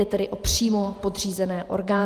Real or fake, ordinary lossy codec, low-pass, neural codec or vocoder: fake; Opus, 32 kbps; 14.4 kHz; vocoder, 48 kHz, 128 mel bands, Vocos